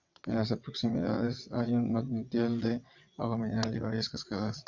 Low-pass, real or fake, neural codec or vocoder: 7.2 kHz; fake; vocoder, 22.05 kHz, 80 mel bands, WaveNeXt